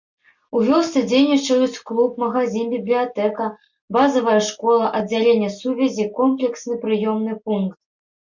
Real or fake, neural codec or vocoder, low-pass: real; none; 7.2 kHz